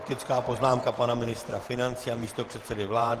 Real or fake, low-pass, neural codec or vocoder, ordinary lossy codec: fake; 14.4 kHz; vocoder, 48 kHz, 128 mel bands, Vocos; Opus, 16 kbps